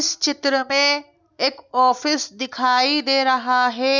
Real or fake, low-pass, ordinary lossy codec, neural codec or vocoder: real; 7.2 kHz; none; none